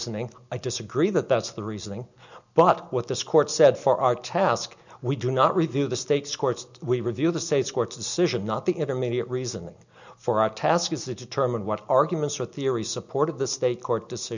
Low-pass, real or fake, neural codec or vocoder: 7.2 kHz; real; none